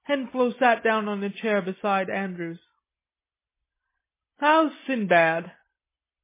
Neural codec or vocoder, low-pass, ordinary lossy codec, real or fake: none; 3.6 kHz; MP3, 16 kbps; real